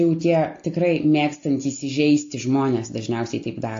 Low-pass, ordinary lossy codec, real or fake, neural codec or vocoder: 7.2 kHz; AAC, 48 kbps; real; none